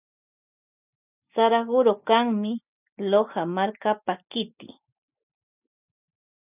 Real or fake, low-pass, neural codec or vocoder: real; 3.6 kHz; none